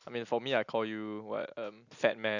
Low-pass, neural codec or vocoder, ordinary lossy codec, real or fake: 7.2 kHz; none; none; real